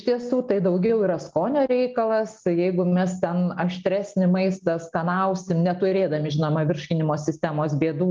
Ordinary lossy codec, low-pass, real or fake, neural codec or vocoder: Opus, 32 kbps; 7.2 kHz; real; none